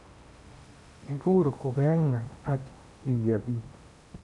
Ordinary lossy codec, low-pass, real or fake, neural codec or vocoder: none; 10.8 kHz; fake; codec, 16 kHz in and 24 kHz out, 0.8 kbps, FocalCodec, streaming, 65536 codes